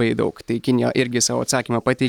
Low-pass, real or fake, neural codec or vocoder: 19.8 kHz; real; none